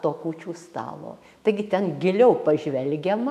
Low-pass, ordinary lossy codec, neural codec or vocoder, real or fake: 14.4 kHz; MP3, 96 kbps; autoencoder, 48 kHz, 128 numbers a frame, DAC-VAE, trained on Japanese speech; fake